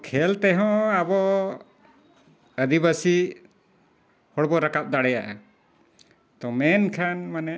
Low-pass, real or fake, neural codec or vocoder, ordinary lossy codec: none; real; none; none